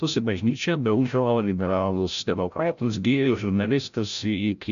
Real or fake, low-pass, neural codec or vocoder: fake; 7.2 kHz; codec, 16 kHz, 0.5 kbps, FreqCodec, larger model